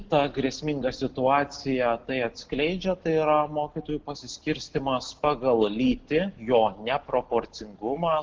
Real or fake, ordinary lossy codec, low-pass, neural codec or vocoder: real; Opus, 16 kbps; 7.2 kHz; none